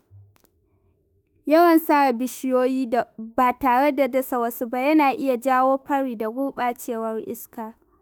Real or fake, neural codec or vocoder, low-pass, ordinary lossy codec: fake; autoencoder, 48 kHz, 32 numbers a frame, DAC-VAE, trained on Japanese speech; none; none